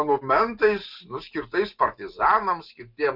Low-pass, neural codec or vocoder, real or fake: 5.4 kHz; none; real